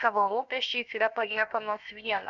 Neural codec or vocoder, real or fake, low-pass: codec, 16 kHz, about 1 kbps, DyCAST, with the encoder's durations; fake; 7.2 kHz